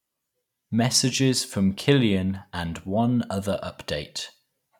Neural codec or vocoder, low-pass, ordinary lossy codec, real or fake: vocoder, 44.1 kHz, 128 mel bands every 256 samples, BigVGAN v2; 19.8 kHz; none; fake